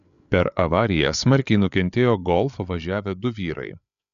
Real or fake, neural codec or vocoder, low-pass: fake; codec, 16 kHz, 8 kbps, FreqCodec, larger model; 7.2 kHz